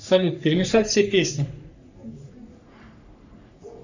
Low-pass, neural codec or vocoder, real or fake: 7.2 kHz; codec, 44.1 kHz, 3.4 kbps, Pupu-Codec; fake